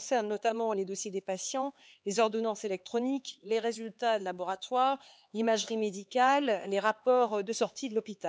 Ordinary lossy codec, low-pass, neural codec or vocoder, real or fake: none; none; codec, 16 kHz, 4 kbps, X-Codec, HuBERT features, trained on LibriSpeech; fake